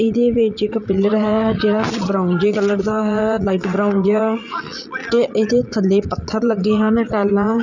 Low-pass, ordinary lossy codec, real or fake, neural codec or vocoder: 7.2 kHz; none; fake; vocoder, 22.05 kHz, 80 mel bands, Vocos